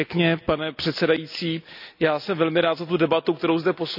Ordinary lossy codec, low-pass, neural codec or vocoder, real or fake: none; 5.4 kHz; none; real